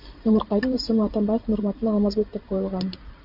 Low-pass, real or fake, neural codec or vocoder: 5.4 kHz; fake; vocoder, 44.1 kHz, 128 mel bands every 512 samples, BigVGAN v2